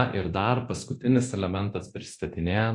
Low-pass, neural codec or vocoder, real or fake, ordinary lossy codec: 10.8 kHz; codec, 24 kHz, 0.9 kbps, DualCodec; fake; AAC, 48 kbps